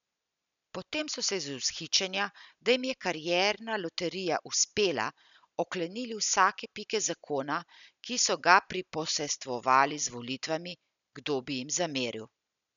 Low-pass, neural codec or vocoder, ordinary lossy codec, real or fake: 7.2 kHz; none; none; real